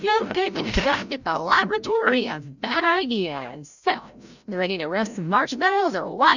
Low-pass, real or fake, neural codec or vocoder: 7.2 kHz; fake; codec, 16 kHz, 0.5 kbps, FreqCodec, larger model